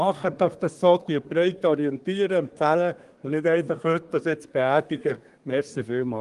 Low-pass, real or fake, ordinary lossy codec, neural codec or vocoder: 10.8 kHz; fake; Opus, 24 kbps; codec, 24 kHz, 1 kbps, SNAC